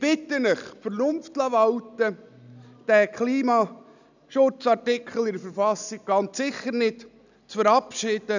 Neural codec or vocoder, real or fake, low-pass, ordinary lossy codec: none; real; 7.2 kHz; none